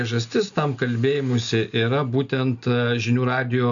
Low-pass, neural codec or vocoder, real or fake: 7.2 kHz; none; real